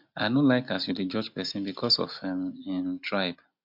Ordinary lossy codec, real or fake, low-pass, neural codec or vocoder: MP3, 48 kbps; real; 5.4 kHz; none